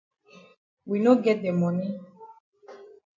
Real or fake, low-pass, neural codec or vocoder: real; 7.2 kHz; none